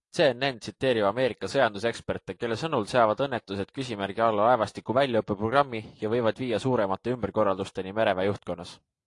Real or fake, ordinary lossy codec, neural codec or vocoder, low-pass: real; AAC, 48 kbps; none; 10.8 kHz